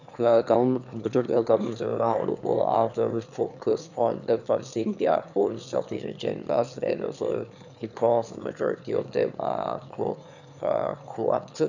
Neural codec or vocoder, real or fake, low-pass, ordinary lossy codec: autoencoder, 22.05 kHz, a latent of 192 numbers a frame, VITS, trained on one speaker; fake; 7.2 kHz; none